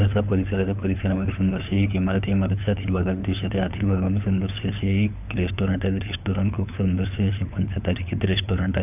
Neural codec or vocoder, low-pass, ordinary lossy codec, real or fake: codec, 16 kHz, 4 kbps, FunCodec, trained on LibriTTS, 50 frames a second; 3.6 kHz; none; fake